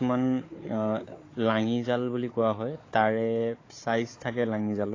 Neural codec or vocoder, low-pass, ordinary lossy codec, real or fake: codec, 16 kHz, 4 kbps, FunCodec, trained on Chinese and English, 50 frames a second; 7.2 kHz; AAC, 32 kbps; fake